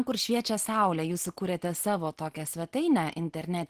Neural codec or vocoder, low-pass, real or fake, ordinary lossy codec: none; 14.4 kHz; real; Opus, 16 kbps